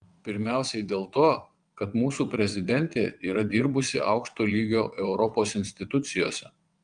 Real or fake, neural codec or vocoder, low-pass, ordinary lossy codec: fake; vocoder, 22.05 kHz, 80 mel bands, WaveNeXt; 9.9 kHz; Opus, 32 kbps